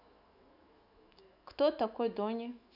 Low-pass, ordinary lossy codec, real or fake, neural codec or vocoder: 5.4 kHz; none; fake; autoencoder, 48 kHz, 128 numbers a frame, DAC-VAE, trained on Japanese speech